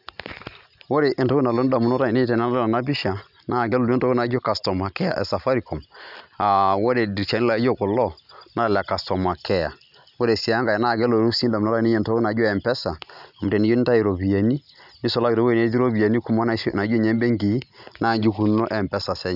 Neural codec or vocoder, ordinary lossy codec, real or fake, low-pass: none; none; real; 5.4 kHz